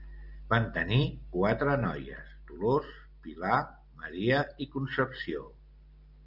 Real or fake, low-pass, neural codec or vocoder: real; 5.4 kHz; none